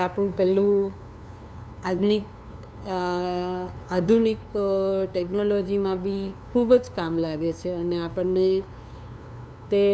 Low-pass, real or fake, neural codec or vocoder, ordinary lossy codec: none; fake; codec, 16 kHz, 2 kbps, FunCodec, trained on LibriTTS, 25 frames a second; none